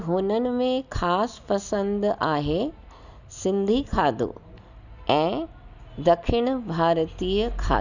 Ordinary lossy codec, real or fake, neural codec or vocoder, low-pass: none; real; none; 7.2 kHz